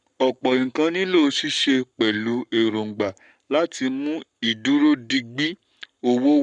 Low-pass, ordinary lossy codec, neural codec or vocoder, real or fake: 9.9 kHz; none; codec, 44.1 kHz, 7.8 kbps, Pupu-Codec; fake